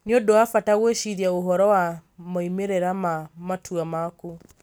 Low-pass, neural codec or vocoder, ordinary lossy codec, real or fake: none; none; none; real